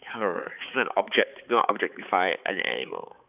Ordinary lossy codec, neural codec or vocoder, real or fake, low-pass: none; codec, 16 kHz, 4 kbps, X-Codec, HuBERT features, trained on balanced general audio; fake; 3.6 kHz